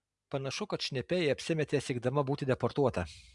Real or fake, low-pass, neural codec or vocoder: real; 10.8 kHz; none